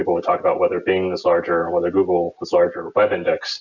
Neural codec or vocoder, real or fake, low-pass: none; real; 7.2 kHz